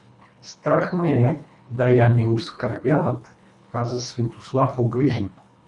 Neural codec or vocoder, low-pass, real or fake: codec, 24 kHz, 1.5 kbps, HILCodec; 10.8 kHz; fake